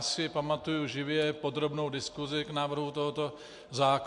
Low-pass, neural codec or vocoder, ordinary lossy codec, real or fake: 10.8 kHz; none; MP3, 64 kbps; real